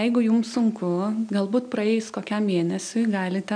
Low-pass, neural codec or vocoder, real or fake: 9.9 kHz; none; real